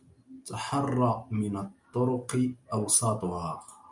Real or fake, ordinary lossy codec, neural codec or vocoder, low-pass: real; MP3, 96 kbps; none; 10.8 kHz